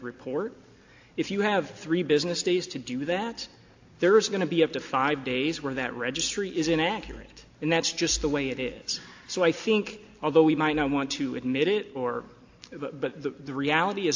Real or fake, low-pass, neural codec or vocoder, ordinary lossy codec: real; 7.2 kHz; none; AAC, 48 kbps